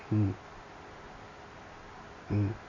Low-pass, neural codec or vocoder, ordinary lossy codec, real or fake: 7.2 kHz; none; AAC, 32 kbps; real